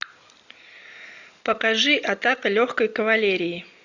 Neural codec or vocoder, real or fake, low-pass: none; real; 7.2 kHz